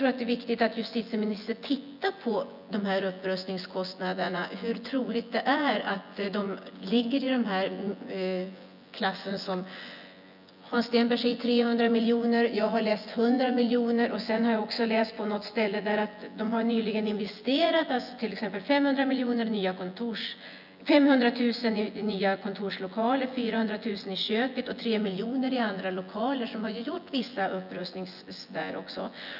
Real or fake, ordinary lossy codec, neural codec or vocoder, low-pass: fake; none; vocoder, 24 kHz, 100 mel bands, Vocos; 5.4 kHz